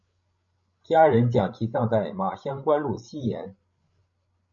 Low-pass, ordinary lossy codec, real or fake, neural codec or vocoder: 7.2 kHz; MP3, 48 kbps; fake; codec, 16 kHz, 16 kbps, FreqCodec, larger model